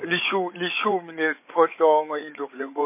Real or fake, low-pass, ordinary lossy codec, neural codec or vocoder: fake; 3.6 kHz; MP3, 24 kbps; codec, 16 kHz, 8 kbps, FreqCodec, larger model